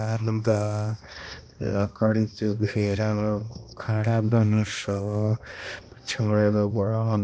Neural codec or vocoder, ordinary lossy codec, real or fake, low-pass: codec, 16 kHz, 1 kbps, X-Codec, HuBERT features, trained on balanced general audio; none; fake; none